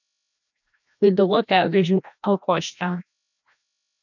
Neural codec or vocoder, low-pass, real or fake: codec, 16 kHz, 1 kbps, FreqCodec, larger model; 7.2 kHz; fake